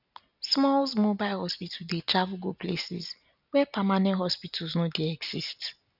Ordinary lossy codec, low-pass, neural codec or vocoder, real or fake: none; 5.4 kHz; none; real